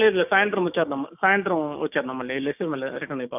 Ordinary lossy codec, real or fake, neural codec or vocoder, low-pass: none; fake; codec, 16 kHz, 6 kbps, DAC; 3.6 kHz